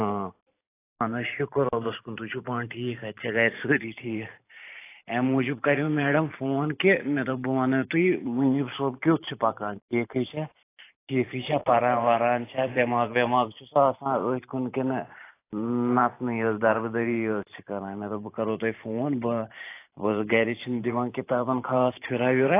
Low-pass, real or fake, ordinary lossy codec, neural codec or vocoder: 3.6 kHz; fake; AAC, 24 kbps; vocoder, 44.1 kHz, 128 mel bands every 512 samples, BigVGAN v2